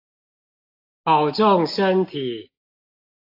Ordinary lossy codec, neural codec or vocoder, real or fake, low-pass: AAC, 32 kbps; none; real; 5.4 kHz